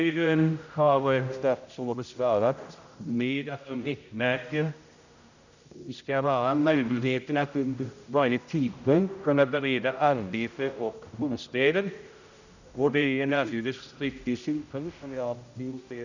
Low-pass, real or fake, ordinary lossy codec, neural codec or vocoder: 7.2 kHz; fake; none; codec, 16 kHz, 0.5 kbps, X-Codec, HuBERT features, trained on general audio